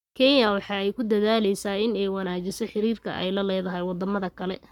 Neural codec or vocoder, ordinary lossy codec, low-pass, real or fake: codec, 44.1 kHz, 7.8 kbps, Pupu-Codec; none; 19.8 kHz; fake